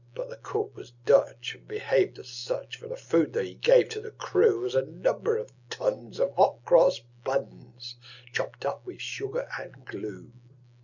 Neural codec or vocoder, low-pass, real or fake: none; 7.2 kHz; real